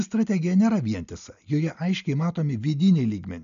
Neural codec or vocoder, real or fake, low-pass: none; real; 7.2 kHz